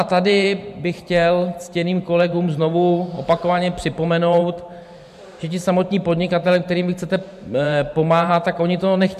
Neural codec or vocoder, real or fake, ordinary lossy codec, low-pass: vocoder, 44.1 kHz, 128 mel bands every 512 samples, BigVGAN v2; fake; MP3, 96 kbps; 14.4 kHz